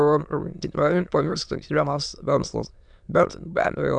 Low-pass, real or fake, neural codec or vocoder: 9.9 kHz; fake; autoencoder, 22.05 kHz, a latent of 192 numbers a frame, VITS, trained on many speakers